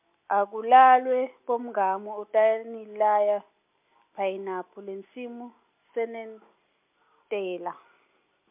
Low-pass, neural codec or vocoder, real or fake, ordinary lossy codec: 3.6 kHz; none; real; MP3, 32 kbps